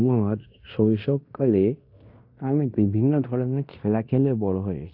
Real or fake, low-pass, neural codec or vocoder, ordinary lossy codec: fake; 5.4 kHz; codec, 16 kHz in and 24 kHz out, 0.9 kbps, LongCat-Audio-Codec, fine tuned four codebook decoder; AAC, 48 kbps